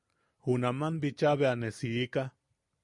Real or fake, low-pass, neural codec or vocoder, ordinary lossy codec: real; 10.8 kHz; none; MP3, 64 kbps